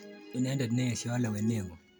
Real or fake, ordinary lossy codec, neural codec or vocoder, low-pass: real; none; none; none